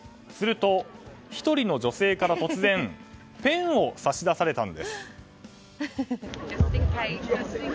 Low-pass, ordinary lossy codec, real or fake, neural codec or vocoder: none; none; real; none